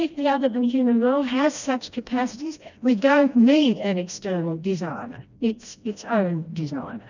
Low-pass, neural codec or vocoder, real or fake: 7.2 kHz; codec, 16 kHz, 1 kbps, FreqCodec, smaller model; fake